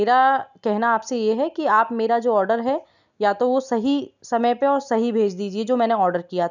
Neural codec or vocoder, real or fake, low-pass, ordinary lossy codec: none; real; 7.2 kHz; none